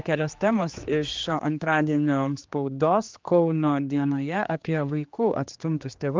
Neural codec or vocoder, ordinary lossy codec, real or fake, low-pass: codec, 16 kHz, 2 kbps, X-Codec, HuBERT features, trained on general audio; Opus, 24 kbps; fake; 7.2 kHz